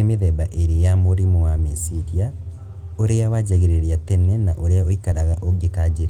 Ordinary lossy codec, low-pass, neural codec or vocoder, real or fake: Opus, 24 kbps; 19.8 kHz; none; real